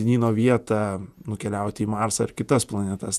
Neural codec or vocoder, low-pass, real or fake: vocoder, 48 kHz, 128 mel bands, Vocos; 14.4 kHz; fake